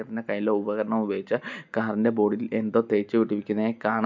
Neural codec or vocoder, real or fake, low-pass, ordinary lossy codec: none; real; 7.2 kHz; MP3, 64 kbps